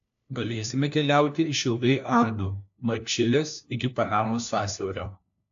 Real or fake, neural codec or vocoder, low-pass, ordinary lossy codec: fake; codec, 16 kHz, 1 kbps, FunCodec, trained on LibriTTS, 50 frames a second; 7.2 kHz; AAC, 64 kbps